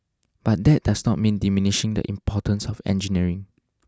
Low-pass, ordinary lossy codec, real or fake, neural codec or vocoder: none; none; real; none